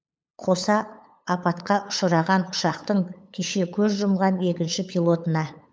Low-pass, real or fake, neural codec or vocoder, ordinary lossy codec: none; fake; codec, 16 kHz, 8 kbps, FunCodec, trained on LibriTTS, 25 frames a second; none